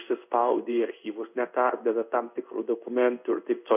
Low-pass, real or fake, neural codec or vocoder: 3.6 kHz; fake; codec, 16 kHz in and 24 kHz out, 1 kbps, XY-Tokenizer